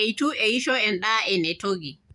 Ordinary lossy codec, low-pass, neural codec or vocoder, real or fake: none; 10.8 kHz; vocoder, 24 kHz, 100 mel bands, Vocos; fake